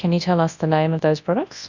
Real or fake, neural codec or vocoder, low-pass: fake; codec, 24 kHz, 0.9 kbps, WavTokenizer, large speech release; 7.2 kHz